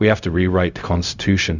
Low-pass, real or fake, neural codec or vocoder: 7.2 kHz; fake; codec, 16 kHz, 0.4 kbps, LongCat-Audio-Codec